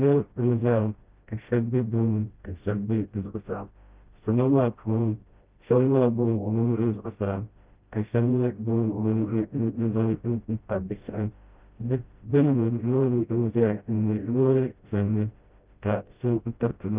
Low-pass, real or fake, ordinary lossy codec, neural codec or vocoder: 3.6 kHz; fake; Opus, 24 kbps; codec, 16 kHz, 0.5 kbps, FreqCodec, smaller model